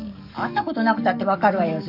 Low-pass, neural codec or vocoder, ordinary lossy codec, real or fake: 5.4 kHz; codec, 44.1 kHz, 7.8 kbps, DAC; none; fake